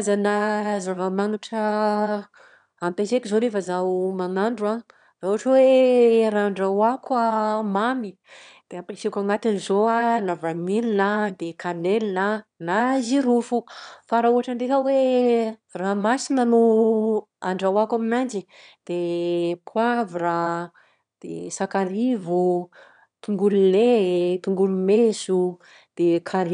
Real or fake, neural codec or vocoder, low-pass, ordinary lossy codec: fake; autoencoder, 22.05 kHz, a latent of 192 numbers a frame, VITS, trained on one speaker; 9.9 kHz; none